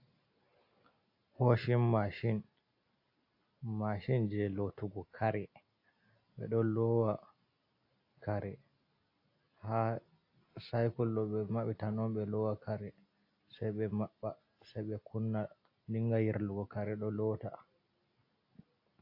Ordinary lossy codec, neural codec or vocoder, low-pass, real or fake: AAC, 32 kbps; none; 5.4 kHz; real